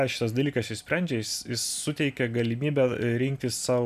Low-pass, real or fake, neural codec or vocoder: 14.4 kHz; real; none